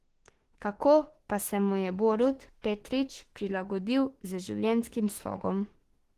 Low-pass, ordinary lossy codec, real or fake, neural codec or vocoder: 14.4 kHz; Opus, 16 kbps; fake; autoencoder, 48 kHz, 32 numbers a frame, DAC-VAE, trained on Japanese speech